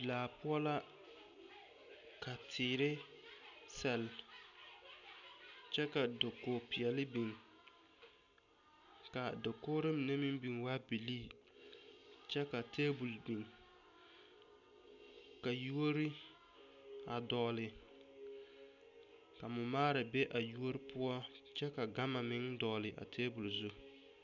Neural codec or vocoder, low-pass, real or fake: none; 7.2 kHz; real